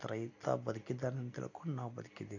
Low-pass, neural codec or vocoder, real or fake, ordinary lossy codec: 7.2 kHz; none; real; AAC, 48 kbps